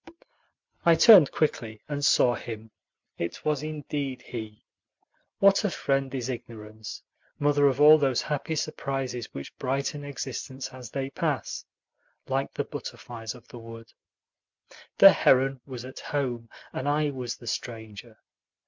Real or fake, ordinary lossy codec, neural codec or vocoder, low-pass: real; MP3, 64 kbps; none; 7.2 kHz